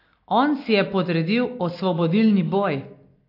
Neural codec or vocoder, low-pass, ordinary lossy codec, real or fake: none; 5.4 kHz; AAC, 32 kbps; real